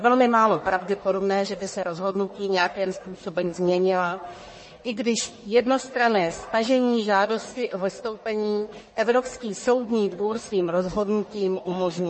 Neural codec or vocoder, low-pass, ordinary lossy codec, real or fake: codec, 44.1 kHz, 1.7 kbps, Pupu-Codec; 10.8 kHz; MP3, 32 kbps; fake